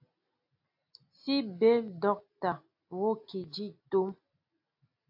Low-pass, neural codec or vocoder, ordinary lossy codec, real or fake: 5.4 kHz; none; AAC, 48 kbps; real